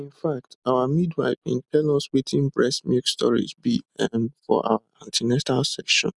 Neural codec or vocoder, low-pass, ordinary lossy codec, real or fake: none; 10.8 kHz; none; real